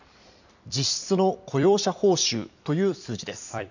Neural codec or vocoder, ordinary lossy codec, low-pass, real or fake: vocoder, 44.1 kHz, 128 mel bands every 256 samples, BigVGAN v2; none; 7.2 kHz; fake